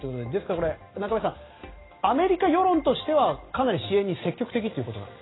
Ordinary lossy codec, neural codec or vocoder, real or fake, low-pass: AAC, 16 kbps; none; real; 7.2 kHz